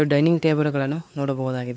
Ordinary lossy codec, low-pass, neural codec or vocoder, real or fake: none; none; codec, 16 kHz, 4 kbps, X-Codec, WavLM features, trained on Multilingual LibriSpeech; fake